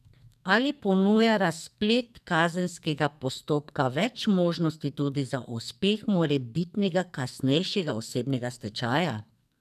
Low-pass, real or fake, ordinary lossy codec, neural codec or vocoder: 14.4 kHz; fake; none; codec, 44.1 kHz, 2.6 kbps, SNAC